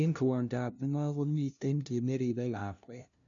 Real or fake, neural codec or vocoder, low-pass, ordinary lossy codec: fake; codec, 16 kHz, 0.5 kbps, FunCodec, trained on LibriTTS, 25 frames a second; 7.2 kHz; none